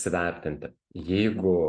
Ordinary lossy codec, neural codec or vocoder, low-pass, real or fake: MP3, 48 kbps; none; 9.9 kHz; real